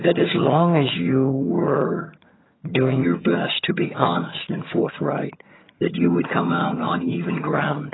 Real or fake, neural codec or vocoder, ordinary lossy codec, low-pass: fake; vocoder, 22.05 kHz, 80 mel bands, HiFi-GAN; AAC, 16 kbps; 7.2 kHz